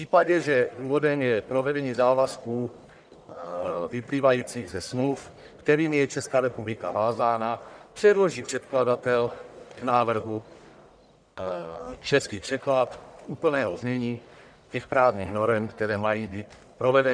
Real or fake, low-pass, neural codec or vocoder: fake; 9.9 kHz; codec, 44.1 kHz, 1.7 kbps, Pupu-Codec